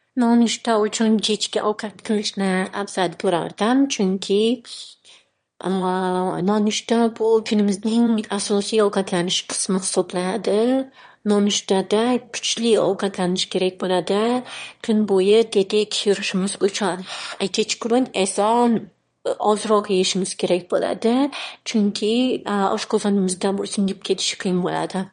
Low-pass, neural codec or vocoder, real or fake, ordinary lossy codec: 9.9 kHz; autoencoder, 22.05 kHz, a latent of 192 numbers a frame, VITS, trained on one speaker; fake; MP3, 48 kbps